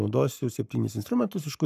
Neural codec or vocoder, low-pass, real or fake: codec, 44.1 kHz, 7.8 kbps, Pupu-Codec; 14.4 kHz; fake